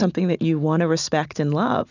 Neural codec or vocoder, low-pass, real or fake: none; 7.2 kHz; real